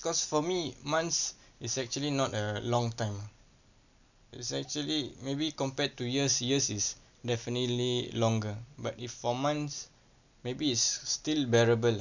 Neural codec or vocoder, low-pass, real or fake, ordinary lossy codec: none; 7.2 kHz; real; none